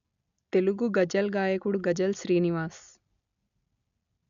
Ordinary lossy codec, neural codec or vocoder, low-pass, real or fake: none; none; 7.2 kHz; real